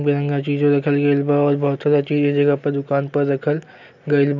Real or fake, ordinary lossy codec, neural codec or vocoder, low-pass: real; none; none; 7.2 kHz